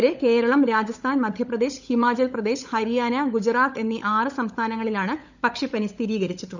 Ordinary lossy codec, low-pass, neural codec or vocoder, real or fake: none; 7.2 kHz; codec, 16 kHz, 16 kbps, FunCodec, trained on LibriTTS, 50 frames a second; fake